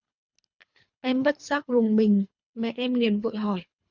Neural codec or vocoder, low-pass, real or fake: codec, 24 kHz, 3 kbps, HILCodec; 7.2 kHz; fake